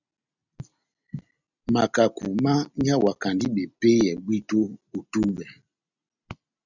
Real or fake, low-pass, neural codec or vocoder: real; 7.2 kHz; none